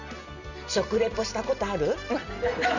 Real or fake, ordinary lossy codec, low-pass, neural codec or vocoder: real; none; 7.2 kHz; none